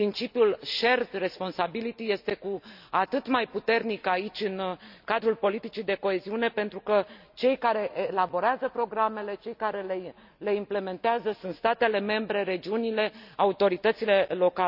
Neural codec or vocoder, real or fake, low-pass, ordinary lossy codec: none; real; 5.4 kHz; none